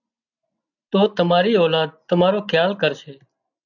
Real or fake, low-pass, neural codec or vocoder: real; 7.2 kHz; none